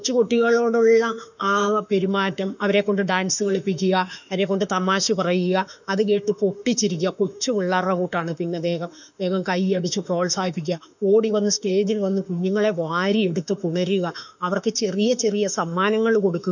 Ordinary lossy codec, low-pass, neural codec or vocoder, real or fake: none; 7.2 kHz; autoencoder, 48 kHz, 32 numbers a frame, DAC-VAE, trained on Japanese speech; fake